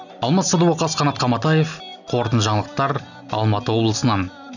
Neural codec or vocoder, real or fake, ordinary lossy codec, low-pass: none; real; none; 7.2 kHz